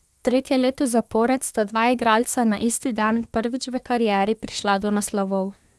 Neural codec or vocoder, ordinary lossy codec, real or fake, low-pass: codec, 24 kHz, 1 kbps, SNAC; none; fake; none